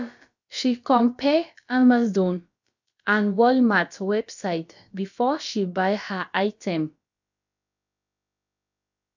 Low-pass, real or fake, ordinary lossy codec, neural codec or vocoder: 7.2 kHz; fake; none; codec, 16 kHz, about 1 kbps, DyCAST, with the encoder's durations